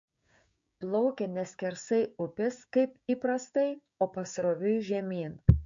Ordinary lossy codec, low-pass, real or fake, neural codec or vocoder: MP3, 48 kbps; 7.2 kHz; fake; codec, 16 kHz, 6 kbps, DAC